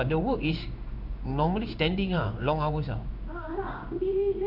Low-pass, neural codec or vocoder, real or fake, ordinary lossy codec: 5.4 kHz; codec, 16 kHz in and 24 kHz out, 1 kbps, XY-Tokenizer; fake; none